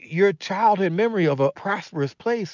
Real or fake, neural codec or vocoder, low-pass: real; none; 7.2 kHz